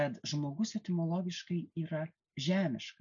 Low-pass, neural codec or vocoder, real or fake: 7.2 kHz; none; real